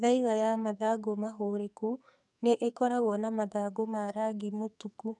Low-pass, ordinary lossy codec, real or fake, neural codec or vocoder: 10.8 kHz; none; fake; codec, 44.1 kHz, 2.6 kbps, SNAC